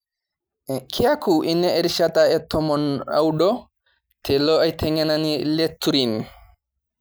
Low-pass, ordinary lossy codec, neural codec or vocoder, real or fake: none; none; none; real